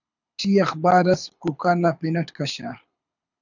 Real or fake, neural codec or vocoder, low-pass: fake; codec, 24 kHz, 6 kbps, HILCodec; 7.2 kHz